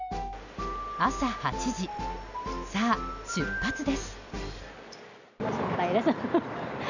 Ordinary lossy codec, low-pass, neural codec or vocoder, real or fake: none; 7.2 kHz; none; real